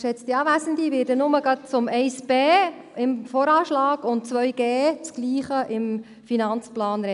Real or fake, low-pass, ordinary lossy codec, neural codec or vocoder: real; 10.8 kHz; none; none